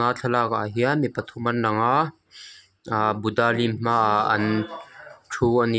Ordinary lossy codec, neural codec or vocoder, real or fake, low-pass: none; none; real; none